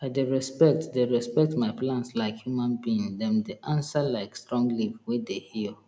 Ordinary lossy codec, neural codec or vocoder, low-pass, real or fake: none; none; none; real